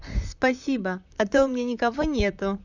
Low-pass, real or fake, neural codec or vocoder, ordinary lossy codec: 7.2 kHz; fake; vocoder, 44.1 kHz, 128 mel bands, Pupu-Vocoder; none